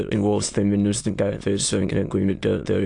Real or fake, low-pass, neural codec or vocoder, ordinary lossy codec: fake; 9.9 kHz; autoencoder, 22.05 kHz, a latent of 192 numbers a frame, VITS, trained on many speakers; AAC, 64 kbps